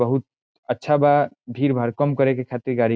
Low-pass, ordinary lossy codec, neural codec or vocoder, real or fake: none; none; none; real